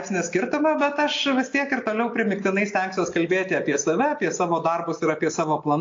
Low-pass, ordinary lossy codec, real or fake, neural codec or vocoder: 7.2 kHz; AAC, 48 kbps; real; none